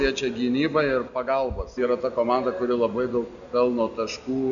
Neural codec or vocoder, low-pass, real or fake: none; 7.2 kHz; real